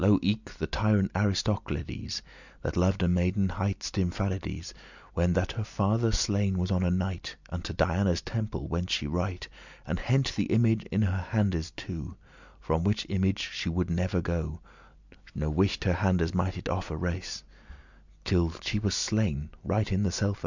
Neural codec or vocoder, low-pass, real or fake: none; 7.2 kHz; real